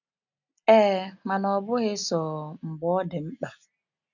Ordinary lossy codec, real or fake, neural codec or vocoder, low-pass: none; real; none; 7.2 kHz